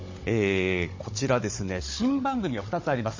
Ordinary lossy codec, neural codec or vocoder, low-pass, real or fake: MP3, 32 kbps; codec, 16 kHz, 16 kbps, FunCodec, trained on Chinese and English, 50 frames a second; 7.2 kHz; fake